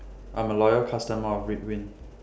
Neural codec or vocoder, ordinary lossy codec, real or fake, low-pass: none; none; real; none